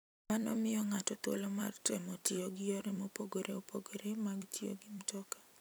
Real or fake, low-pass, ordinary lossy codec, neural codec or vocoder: fake; none; none; vocoder, 44.1 kHz, 128 mel bands every 256 samples, BigVGAN v2